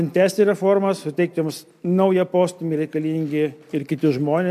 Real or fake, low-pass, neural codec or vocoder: real; 14.4 kHz; none